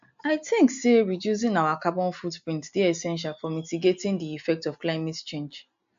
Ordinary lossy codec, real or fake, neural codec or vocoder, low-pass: AAC, 64 kbps; real; none; 7.2 kHz